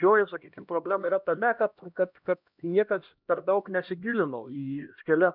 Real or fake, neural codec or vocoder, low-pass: fake; codec, 16 kHz, 1 kbps, X-Codec, HuBERT features, trained on LibriSpeech; 5.4 kHz